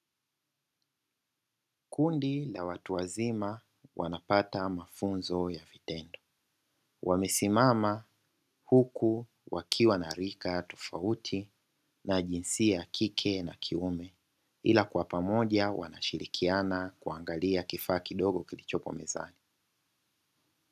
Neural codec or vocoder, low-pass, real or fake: none; 14.4 kHz; real